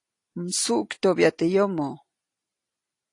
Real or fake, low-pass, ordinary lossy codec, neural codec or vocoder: real; 10.8 kHz; AAC, 48 kbps; none